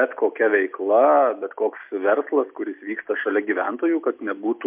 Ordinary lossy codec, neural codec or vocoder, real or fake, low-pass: MP3, 32 kbps; none; real; 3.6 kHz